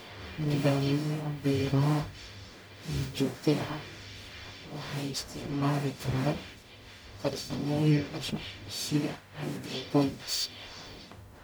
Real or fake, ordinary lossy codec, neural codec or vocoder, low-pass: fake; none; codec, 44.1 kHz, 0.9 kbps, DAC; none